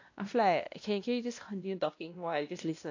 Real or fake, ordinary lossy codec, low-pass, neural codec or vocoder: fake; AAC, 48 kbps; 7.2 kHz; codec, 16 kHz, 1 kbps, X-Codec, WavLM features, trained on Multilingual LibriSpeech